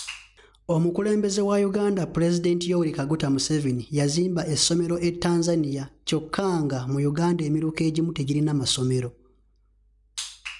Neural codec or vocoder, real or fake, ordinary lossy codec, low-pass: none; real; none; 10.8 kHz